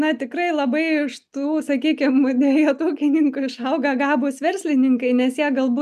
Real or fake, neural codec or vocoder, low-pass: real; none; 14.4 kHz